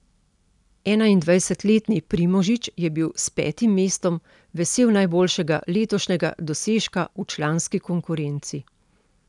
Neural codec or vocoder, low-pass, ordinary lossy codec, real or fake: none; 10.8 kHz; none; real